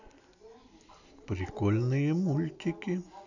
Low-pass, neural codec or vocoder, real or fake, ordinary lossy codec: 7.2 kHz; none; real; none